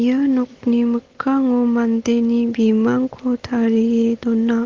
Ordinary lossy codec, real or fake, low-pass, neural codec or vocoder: Opus, 16 kbps; real; 7.2 kHz; none